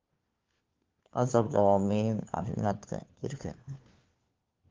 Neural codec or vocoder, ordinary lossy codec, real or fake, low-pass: codec, 16 kHz, 4 kbps, FunCodec, trained on LibriTTS, 50 frames a second; Opus, 32 kbps; fake; 7.2 kHz